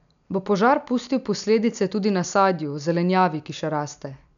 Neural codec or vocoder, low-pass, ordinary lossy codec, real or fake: none; 7.2 kHz; none; real